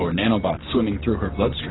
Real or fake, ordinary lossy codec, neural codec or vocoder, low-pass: real; AAC, 16 kbps; none; 7.2 kHz